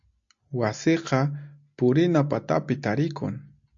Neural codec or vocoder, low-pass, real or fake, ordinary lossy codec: none; 7.2 kHz; real; AAC, 64 kbps